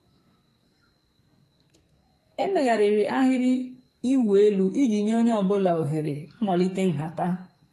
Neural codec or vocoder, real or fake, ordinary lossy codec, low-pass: codec, 44.1 kHz, 2.6 kbps, SNAC; fake; AAC, 48 kbps; 14.4 kHz